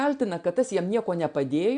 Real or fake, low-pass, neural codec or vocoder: real; 9.9 kHz; none